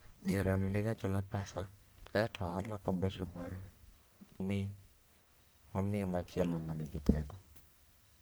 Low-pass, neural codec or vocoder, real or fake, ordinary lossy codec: none; codec, 44.1 kHz, 1.7 kbps, Pupu-Codec; fake; none